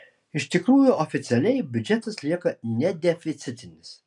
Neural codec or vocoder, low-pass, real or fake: none; 10.8 kHz; real